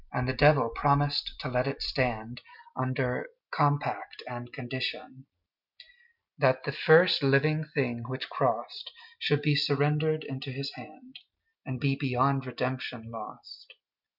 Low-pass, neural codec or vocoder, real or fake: 5.4 kHz; none; real